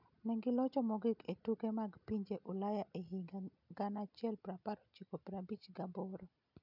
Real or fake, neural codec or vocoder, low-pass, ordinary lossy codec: real; none; 5.4 kHz; none